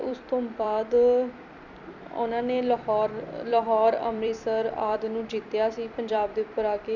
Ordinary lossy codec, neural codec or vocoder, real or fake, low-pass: none; vocoder, 44.1 kHz, 128 mel bands every 256 samples, BigVGAN v2; fake; 7.2 kHz